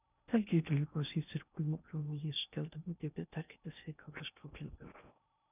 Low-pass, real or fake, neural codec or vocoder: 3.6 kHz; fake; codec, 16 kHz in and 24 kHz out, 0.6 kbps, FocalCodec, streaming, 2048 codes